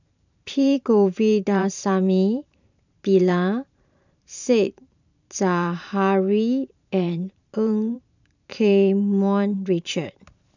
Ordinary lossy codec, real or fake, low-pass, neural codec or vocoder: none; fake; 7.2 kHz; vocoder, 44.1 kHz, 128 mel bands every 512 samples, BigVGAN v2